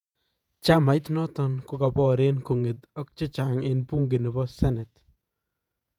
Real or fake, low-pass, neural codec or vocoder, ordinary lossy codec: fake; 19.8 kHz; vocoder, 48 kHz, 128 mel bands, Vocos; none